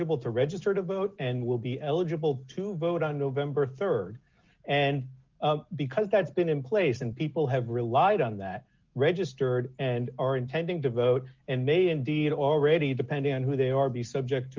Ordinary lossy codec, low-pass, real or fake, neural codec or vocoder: Opus, 32 kbps; 7.2 kHz; real; none